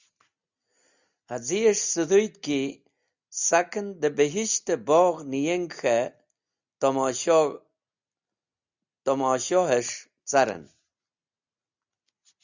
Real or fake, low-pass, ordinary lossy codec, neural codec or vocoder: real; 7.2 kHz; Opus, 64 kbps; none